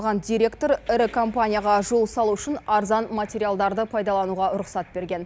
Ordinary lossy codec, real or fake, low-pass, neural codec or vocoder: none; real; none; none